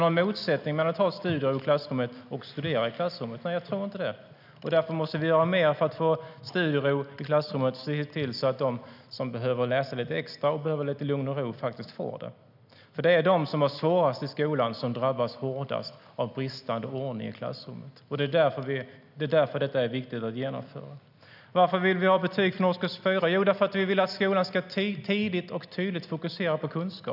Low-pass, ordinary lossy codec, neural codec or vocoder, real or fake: 5.4 kHz; none; none; real